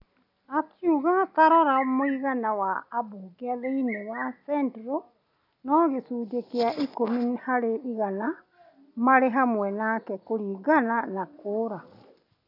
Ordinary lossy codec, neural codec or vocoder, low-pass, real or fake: none; none; 5.4 kHz; real